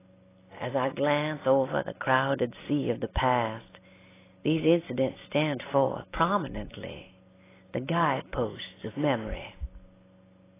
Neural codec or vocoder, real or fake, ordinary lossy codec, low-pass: none; real; AAC, 16 kbps; 3.6 kHz